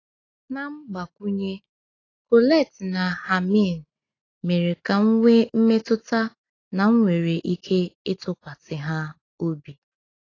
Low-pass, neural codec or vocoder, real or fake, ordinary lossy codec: 7.2 kHz; none; real; AAC, 32 kbps